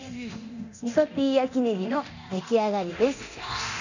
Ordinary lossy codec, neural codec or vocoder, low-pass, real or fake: none; codec, 24 kHz, 0.9 kbps, DualCodec; 7.2 kHz; fake